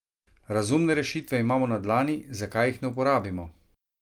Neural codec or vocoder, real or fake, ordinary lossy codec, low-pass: none; real; Opus, 32 kbps; 19.8 kHz